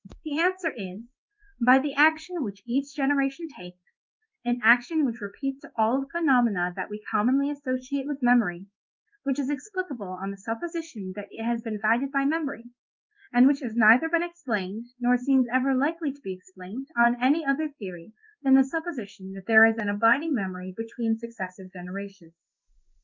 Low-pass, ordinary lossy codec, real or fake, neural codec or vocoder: 7.2 kHz; Opus, 24 kbps; fake; codec, 16 kHz in and 24 kHz out, 1 kbps, XY-Tokenizer